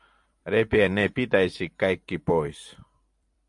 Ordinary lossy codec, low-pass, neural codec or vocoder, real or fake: AAC, 64 kbps; 10.8 kHz; vocoder, 44.1 kHz, 128 mel bands every 256 samples, BigVGAN v2; fake